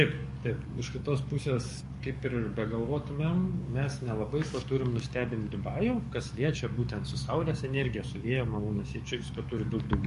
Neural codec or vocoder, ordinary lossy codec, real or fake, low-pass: codec, 44.1 kHz, 7.8 kbps, DAC; MP3, 48 kbps; fake; 14.4 kHz